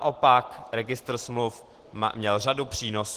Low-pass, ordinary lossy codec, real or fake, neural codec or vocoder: 14.4 kHz; Opus, 16 kbps; real; none